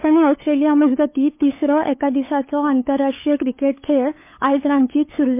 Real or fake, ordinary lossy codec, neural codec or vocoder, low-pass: fake; MP3, 24 kbps; codec, 16 kHz, 8 kbps, FunCodec, trained on LibriTTS, 25 frames a second; 3.6 kHz